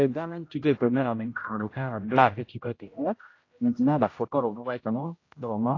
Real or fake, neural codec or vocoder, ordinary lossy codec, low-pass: fake; codec, 16 kHz, 0.5 kbps, X-Codec, HuBERT features, trained on general audio; AAC, 32 kbps; 7.2 kHz